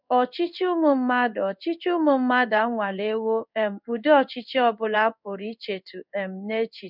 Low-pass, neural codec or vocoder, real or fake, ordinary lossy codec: 5.4 kHz; codec, 16 kHz in and 24 kHz out, 1 kbps, XY-Tokenizer; fake; none